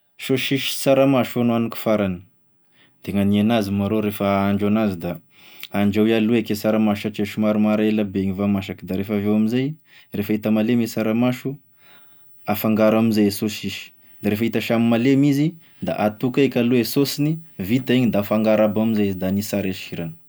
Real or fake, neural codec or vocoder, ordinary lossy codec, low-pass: real; none; none; none